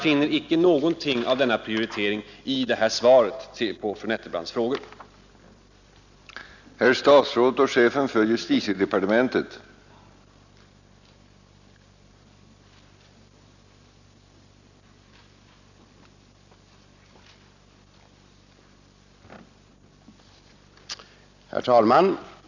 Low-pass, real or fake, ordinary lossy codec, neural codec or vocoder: 7.2 kHz; real; none; none